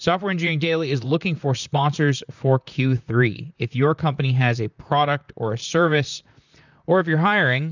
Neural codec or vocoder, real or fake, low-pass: vocoder, 44.1 kHz, 128 mel bands, Pupu-Vocoder; fake; 7.2 kHz